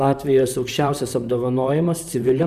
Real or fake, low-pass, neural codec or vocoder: fake; 14.4 kHz; vocoder, 44.1 kHz, 128 mel bands, Pupu-Vocoder